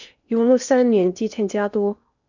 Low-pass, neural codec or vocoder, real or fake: 7.2 kHz; codec, 16 kHz in and 24 kHz out, 0.8 kbps, FocalCodec, streaming, 65536 codes; fake